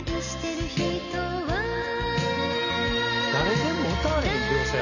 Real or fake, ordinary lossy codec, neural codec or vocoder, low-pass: real; none; none; 7.2 kHz